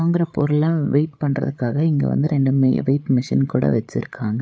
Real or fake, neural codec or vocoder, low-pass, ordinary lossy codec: fake; codec, 16 kHz, 8 kbps, FreqCodec, larger model; none; none